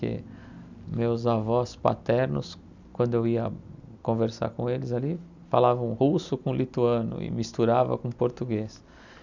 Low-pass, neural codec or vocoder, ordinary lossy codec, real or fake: 7.2 kHz; none; none; real